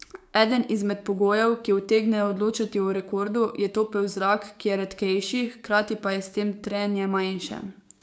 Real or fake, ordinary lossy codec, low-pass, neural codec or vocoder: fake; none; none; codec, 16 kHz, 6 kbps, DAC